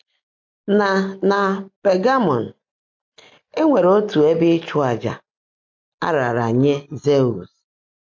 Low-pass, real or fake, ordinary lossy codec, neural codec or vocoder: 7.2 kHz; real; MP3, 48 kbps; none